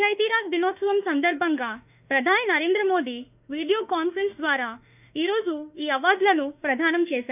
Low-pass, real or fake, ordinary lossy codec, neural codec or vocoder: 3.6 kHz; fake; none; autoencoder, 48 kHz, 32 numbers a frame, DAC-VAE, trained on Japanese speech